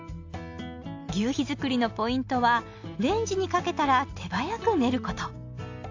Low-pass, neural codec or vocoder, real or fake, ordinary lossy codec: 7.2 kHz; none; real; AAC, 48 kbps